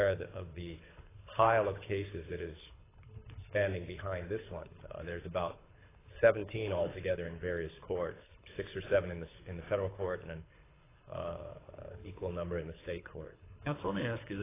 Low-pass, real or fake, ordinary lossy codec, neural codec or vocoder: 3.6 kHz; fake; AAC, 16 kbps; codec, 24 kHz, 6 kbps, HILCodec